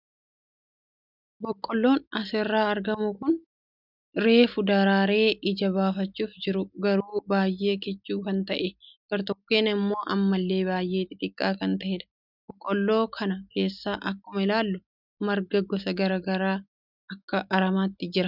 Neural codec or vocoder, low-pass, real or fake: none; 5.4 kHz; real